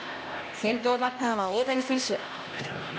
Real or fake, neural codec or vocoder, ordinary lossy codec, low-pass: fake; codec, 16 kHz, 1 kbps, X-Codec, HuBERT features, trained on LibriSpeech; none; none